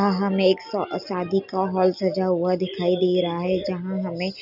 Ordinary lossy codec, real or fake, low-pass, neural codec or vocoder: none; real; 5.4 kHz; none